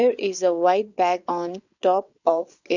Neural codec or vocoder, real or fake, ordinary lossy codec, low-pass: vocoder, 22.05 kHz, 80 mel bands, WaveNeXt; fake; none; 7.2 kHz